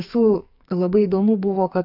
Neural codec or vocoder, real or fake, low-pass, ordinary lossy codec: codec, 44.1 kHz, 2.6 kbps, SNAC; fake; 5.4 kHz; AAC, 48 kbps